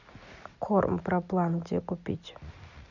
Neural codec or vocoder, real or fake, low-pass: none; real; 7.2 kHz